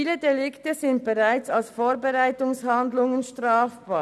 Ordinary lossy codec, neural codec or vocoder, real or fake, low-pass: none; none; real; none